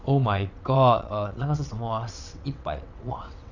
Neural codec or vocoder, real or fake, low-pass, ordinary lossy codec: vocoder, 22.05 kHz, 80 mel bands, WaveNeXt; fake; 7.2 kHz; none